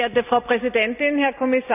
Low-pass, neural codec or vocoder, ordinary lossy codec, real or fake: 3.6 kHz; none; none; real